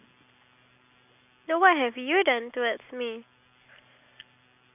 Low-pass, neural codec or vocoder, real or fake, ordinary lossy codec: 3.6 kHz; none; real; none